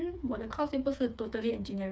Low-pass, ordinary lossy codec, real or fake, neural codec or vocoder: none; none; fake; codec, 16 kHz, 4 kbps, FreqCodec, smaller model